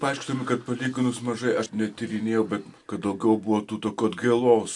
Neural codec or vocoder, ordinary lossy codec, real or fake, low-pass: none; AAC, 64 kbps; real; 10.8 kHz